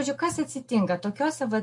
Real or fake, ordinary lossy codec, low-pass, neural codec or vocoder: real; MP3, 48 kbps; 10.8 kHz; none